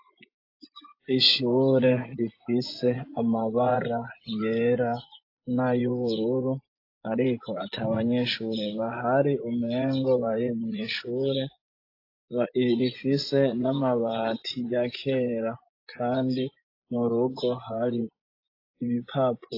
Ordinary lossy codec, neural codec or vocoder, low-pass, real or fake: AAC, 32 kbps; vocoder, 24 kHz, 100 mel bands, Vocos; 5.4 kHz; fake